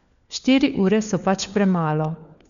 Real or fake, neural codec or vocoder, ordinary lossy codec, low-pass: fake; codec, 16 kHz, 4 kbps, FunCodec, trained on LibriTTS, 50 frames a second; none; 7.2 kHz